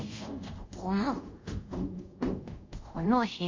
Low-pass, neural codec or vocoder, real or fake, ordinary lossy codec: 7.2 kHz; codec, 24 kHz, 0.5 kbps, DualCodec; fake; MP3, 48 kbps